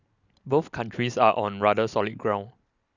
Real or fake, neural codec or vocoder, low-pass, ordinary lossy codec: real; none; 7.2 kHz; none